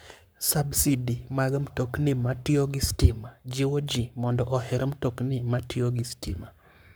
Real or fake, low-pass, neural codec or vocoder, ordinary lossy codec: fake; none; codec, 44.1 kHz, 7.8 kbps, Pupu-Codec; none